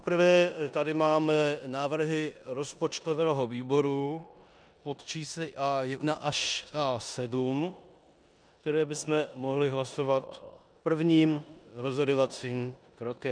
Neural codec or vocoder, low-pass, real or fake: codec, 16 kHz in and 24 kHz out, 0.9 kbps, LongCat-Audio-Codec, four codebook decoder; 9.9 kHz; fake